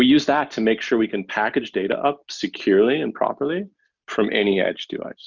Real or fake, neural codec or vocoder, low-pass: real; none; 7.2 kHz